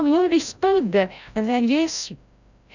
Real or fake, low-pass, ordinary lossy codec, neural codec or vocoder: fake; 7.2 kHz; none; codec, 16 kHz, 0.5 kbps, FreqCodec, larger model